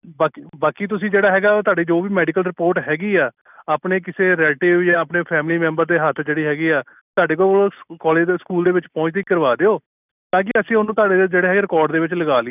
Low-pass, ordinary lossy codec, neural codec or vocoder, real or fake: 3.6 kHz; none; none; real